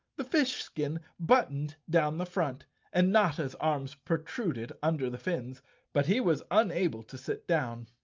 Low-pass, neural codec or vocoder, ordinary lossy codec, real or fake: 7.2 kHz; none; Opus, 24 kbps; real